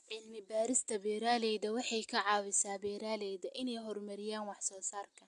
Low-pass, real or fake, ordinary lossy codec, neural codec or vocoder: 10.8 kHz; real; AAC, 64 kbps; none